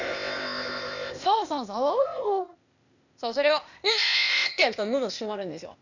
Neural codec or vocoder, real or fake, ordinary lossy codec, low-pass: codec, 16 kHz, 0.8 kbps, ZipCodec; fake; none; 7.2 kHz